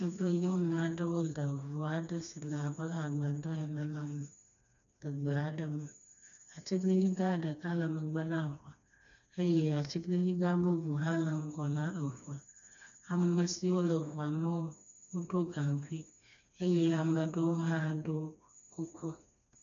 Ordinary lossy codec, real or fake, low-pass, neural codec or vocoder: MP3, 96 kbps; fake; 7.2 kHz; codec, 16 kHz, 2 kbps, FreqCodec, smaller model